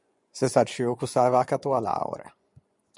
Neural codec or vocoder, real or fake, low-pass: vocoder, 24 kHz, 100 mel bands, Vocos; fake; 10.8 kHz